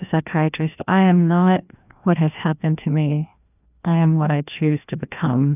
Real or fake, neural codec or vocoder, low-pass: fake; codec, 16 kHz, 1 kbps, FreqCodec, larger model; 3.6 kHz